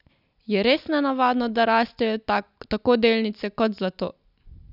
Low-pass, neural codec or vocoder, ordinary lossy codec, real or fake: 5.4 kHz; none; none; real